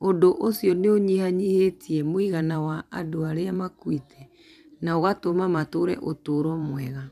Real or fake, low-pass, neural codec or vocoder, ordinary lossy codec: fake; 14.4 kHz; vocoder, 44.1 kHz, 128 mel bands every 512 samples, BigVGAN v2; none